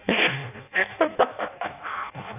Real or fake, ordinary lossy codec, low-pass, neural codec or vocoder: fake; AAC, 32 kbps; 3.6 kHz; codec, 16 kHz in and 24 kHz out, 0.6 kbps, FireRedTTS-2 codec